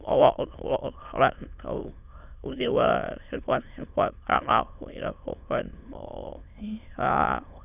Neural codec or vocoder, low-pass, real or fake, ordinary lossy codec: autoencoder, 22.05 kHz, a latent of 192 numbers a frame, VITS, trained on many speakers; 3.6 kHz; fake; none